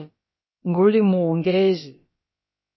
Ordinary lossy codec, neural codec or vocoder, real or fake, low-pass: MP3, 24 kbps; codec, 16 kHz, about 1 kbps, DyCAST, with the encoder's durations; fake; 7.2 kHz